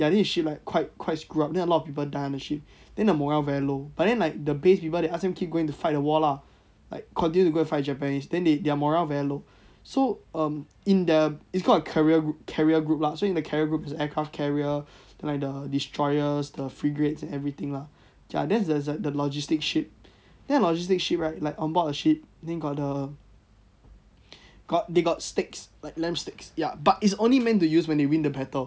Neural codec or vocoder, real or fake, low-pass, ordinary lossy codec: none; real; none; none